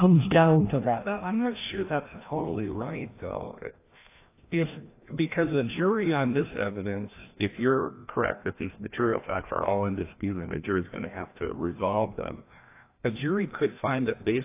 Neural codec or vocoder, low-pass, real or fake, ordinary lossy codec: codec, 16 kHz, 1 kbps, FreqCodec, larger model; 3.6 kHz; fake; AAC, 24 kbps